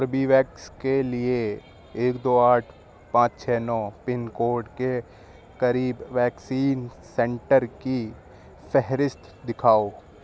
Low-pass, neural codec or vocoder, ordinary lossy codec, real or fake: none; none; none; real